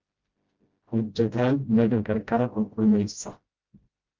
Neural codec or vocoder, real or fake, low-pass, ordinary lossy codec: codec, 16 kHz, 0.5 kbps, FreqCodec, smaller model; fake; 7.2 kHz; Opus, 24 kbps